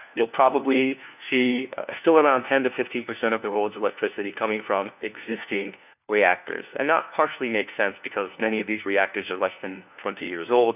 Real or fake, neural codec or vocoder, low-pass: fake; codec, 16 kHz, 1 kbps, FunCodec, trained on LibriTTS, 50 frames a second; 3.6 kHz